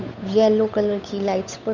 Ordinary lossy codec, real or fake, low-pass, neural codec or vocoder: none; fake; 7.2 kHz; codec, 16 kHz, 8 kbps, FunCodec, trained on Chinese and English, 25 frames a second